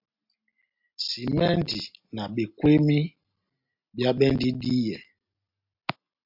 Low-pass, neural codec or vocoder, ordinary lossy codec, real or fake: 5.4 kHz; none; MP3, 48 kbps; real